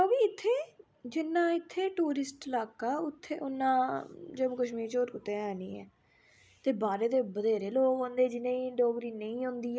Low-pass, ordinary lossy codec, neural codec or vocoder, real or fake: none; none; none; real